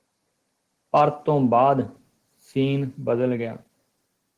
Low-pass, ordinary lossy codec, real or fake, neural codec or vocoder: 14.4 kHz; Opus, 16 kbps; real; none